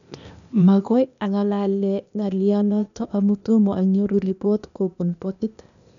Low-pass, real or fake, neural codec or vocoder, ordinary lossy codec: 7.2 kHz; fake; codec, 16 kHz, 0.8 kbps, ZipCodec; none